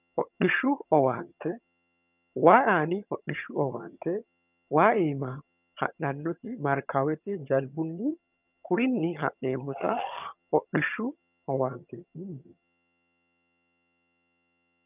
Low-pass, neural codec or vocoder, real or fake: 3.6 kHz; vocoder, 22.05 kHz, 80 mel bands, HiFi-GAN; fake